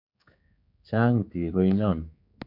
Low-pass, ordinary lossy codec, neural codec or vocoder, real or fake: 5.4 kHz; MP3, 48 kbps; codec, 16 kHz, 4 kbps, X-Codec, HuBERT features, trained on general audio; fake